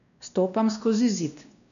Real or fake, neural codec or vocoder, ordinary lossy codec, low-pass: fake; codec, 16 kHz, 1 kbps, X-Codec, WavLM features, trained on Multilingual LibriSpeech; none; 7.2 kHz